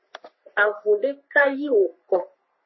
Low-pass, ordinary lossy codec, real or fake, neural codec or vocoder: 7.2 kHz; MP3, 24 kbps; fake; codec, 44.1 kHz, 3.4 kbps, Pupu-Codec